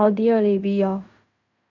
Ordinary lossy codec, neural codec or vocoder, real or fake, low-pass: none; codec, 16 kHz in and 24 kHz out, 0.4 kbps, LongCat-Audio-Codec, fine tuned four codebook decoder; fake; 7.2 kHz